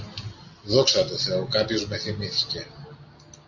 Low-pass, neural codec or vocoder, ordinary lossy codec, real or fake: 7.2 kHz; none; AAC, 48 kbps; real